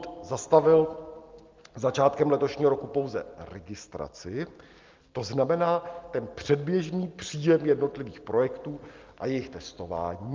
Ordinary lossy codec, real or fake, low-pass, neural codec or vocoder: Opus, 32 kbps; real; 7.2 kHz; none